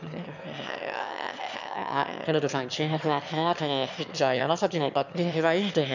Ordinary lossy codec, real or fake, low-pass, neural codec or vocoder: none; fake; 7.2 kHz; autoencoder, 22.05 kHz, a latent of 192 numbers a frame, VITS, trained on one speaker